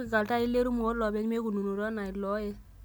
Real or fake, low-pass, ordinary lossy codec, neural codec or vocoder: real; none; none; none